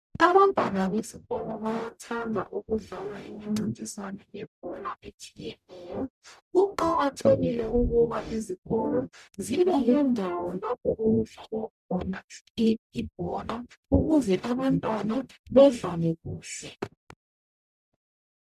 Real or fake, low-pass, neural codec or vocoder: fake; 14.4 kHz; codec, 44.1 kHz, 0.9 kbps, DAC